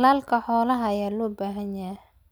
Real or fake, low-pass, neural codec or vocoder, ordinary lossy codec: real; none; none; none